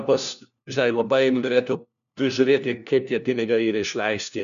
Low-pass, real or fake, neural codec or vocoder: 7.2 kHz; fake; codec, 16 kHz, 1 kbps, FunCodec, trained on LibriTTS, 50 frames a second